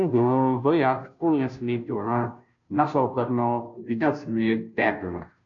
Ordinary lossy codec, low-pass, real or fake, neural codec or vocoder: AAC, 64 kbps; 7.2 kHz; fake; codec, 16 kHz, 0.5 kbps, FunCodec, trained on Chinese and English, 25 frames a second